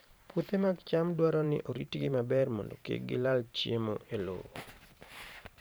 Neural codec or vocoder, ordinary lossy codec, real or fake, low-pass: vocoder, 44.1 kHz, 128 mel bands every 512 samples, BigVGAN v2; none; fake; none